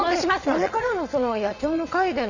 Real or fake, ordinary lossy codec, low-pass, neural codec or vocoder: fake; none; 7.2 kHz; vocoder, 22.05 kHz, 80 mel bands, Vocos